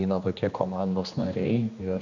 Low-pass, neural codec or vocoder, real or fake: 7.2 kHz; codec, 16 kHz, 2 kbps, X-Codec, HuBERT features, trained on general audio; fake